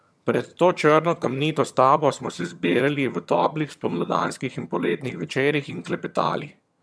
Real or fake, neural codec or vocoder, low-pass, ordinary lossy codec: fake; vocoder, 22.05 kHz, 80 mel bands, HiFi-GAN; none; none